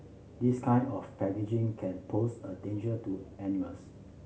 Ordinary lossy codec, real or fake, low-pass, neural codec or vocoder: none; real; none; none